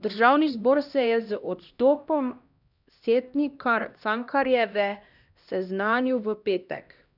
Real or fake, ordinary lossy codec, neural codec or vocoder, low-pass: fake; none; codec, 16 kHz, 1 kbps, X-Codec, HuBERT features, trained on LibriSpeech; 5.4 kHz